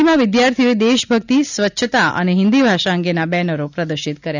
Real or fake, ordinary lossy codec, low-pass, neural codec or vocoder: real; none; 7.2 kHz; none